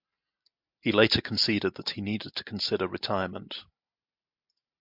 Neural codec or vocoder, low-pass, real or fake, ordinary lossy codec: none; 5.4 kHz; real; MP3, 48 kbps